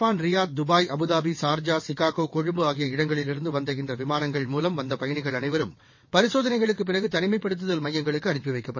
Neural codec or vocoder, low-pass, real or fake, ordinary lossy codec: vocoder, 22.05 kHz, 80 mel bands, WaveNeXt; 7.2 kHz; fake; MP3, 32 kbps